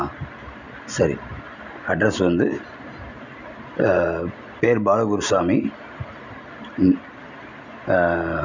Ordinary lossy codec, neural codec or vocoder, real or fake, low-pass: none; none; real; 7.2 kHz